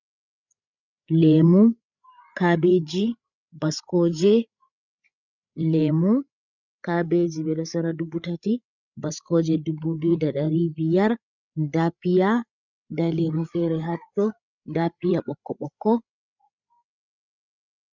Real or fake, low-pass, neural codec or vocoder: fake; 7.2 kHz; codec, 16 kHz, 8 kbps, FreqCodec, larger model